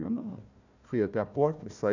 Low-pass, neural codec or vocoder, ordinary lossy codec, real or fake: 7.2 kHz; codec, 16 kHz, 1 kbps, FunCodec, trained on Chinese and English, 50 frames a second; none; fake